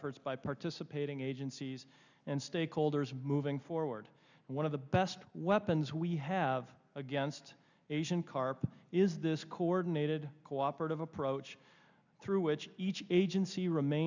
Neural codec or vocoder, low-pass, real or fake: none; 7.2 kHz; real